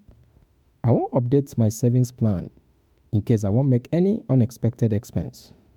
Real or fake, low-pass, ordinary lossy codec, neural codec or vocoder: fake; 19.8 kHz; MP3, 96 kbps; autoencoder, 48 kHz, 32 numbers a frame, DAC-VAE, trained on Japanese speech